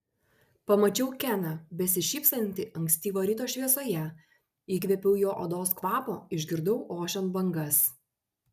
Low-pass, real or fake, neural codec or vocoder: 14.4 kHz; real; none